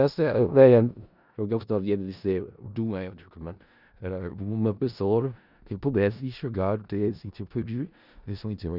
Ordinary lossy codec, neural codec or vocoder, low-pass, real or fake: none; codec, 16 kHz in and 24 kHz out, 0.4 kbps, LongCat-Audio-Codec, four codebook decoder; 5.4 kHz; fake